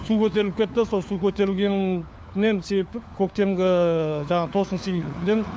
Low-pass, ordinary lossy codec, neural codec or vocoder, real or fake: none; none; codec, 16 kHz, 4 kbps, FunCodec, trained on LibriTTS, 50 frames a second; fake